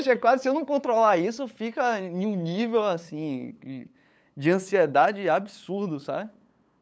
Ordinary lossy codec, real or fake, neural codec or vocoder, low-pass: none; fake; codec, 16 kHz, 8 kbps, FunCodec, trained on LibriTTS, 25 frames a second; none